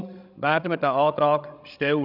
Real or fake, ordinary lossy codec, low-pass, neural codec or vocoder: fake; none; 5.4 kHz; codec, 16 kHz, 16 kbps, FreqCodec, larger model